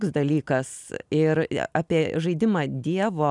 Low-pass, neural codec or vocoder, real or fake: 10.8 kHz; none; real